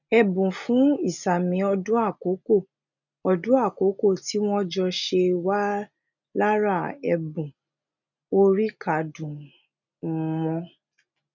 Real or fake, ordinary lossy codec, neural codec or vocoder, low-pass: real; none; none; 7.2 kHz